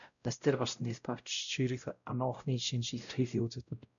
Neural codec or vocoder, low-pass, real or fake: codec, 16 kHz, 0.5 kbps, X-Codec, WavLM features, trained on Multilingual LibriSpeech; 7.2 kHz; fake